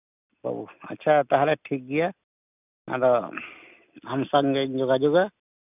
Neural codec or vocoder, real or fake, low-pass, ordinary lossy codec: none; real; 3.6 kHz; none